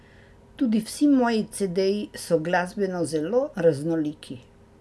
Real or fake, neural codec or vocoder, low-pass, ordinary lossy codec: real; none; none; none